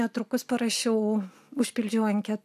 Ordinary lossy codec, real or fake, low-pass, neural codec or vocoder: AAC, 96 kbps; real; 14.4 kHz; none